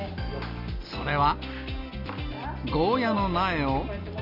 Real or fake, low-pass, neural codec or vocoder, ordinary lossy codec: real; 5.4 kHz; none; none